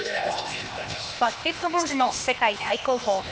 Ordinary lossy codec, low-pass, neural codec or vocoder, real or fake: none; none; codec, 16 kHz, 0.8 kbps, ZipCodec; fake